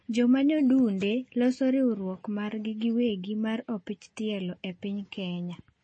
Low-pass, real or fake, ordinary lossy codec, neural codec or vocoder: 9.9 kHz; real; MP3, 32 kbps; none